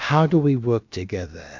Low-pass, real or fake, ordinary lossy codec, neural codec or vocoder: 7.2 kHz; fake; MP3, 64 kbps; codec, 16 kHz, about 1 kbps, DyCAST, with the encoder's durations